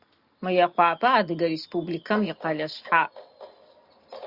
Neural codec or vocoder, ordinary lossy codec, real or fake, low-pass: none; Opus, 64 kbps; real; 5.4 kHz